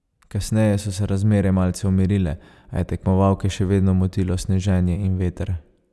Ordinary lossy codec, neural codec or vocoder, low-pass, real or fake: none; none; none; real